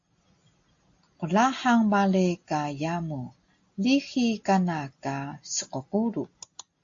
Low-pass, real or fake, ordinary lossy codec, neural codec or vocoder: 7.2 kHz; real; AAC, 48 kbps; none